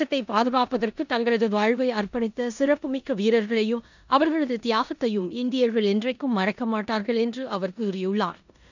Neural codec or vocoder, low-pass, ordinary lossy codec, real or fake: codec, 16 kHz in and 24 kHz out, 0.9 kbps, LongCat-Audio-Codec, four codebook decoder; 7.2 kHz; none; fake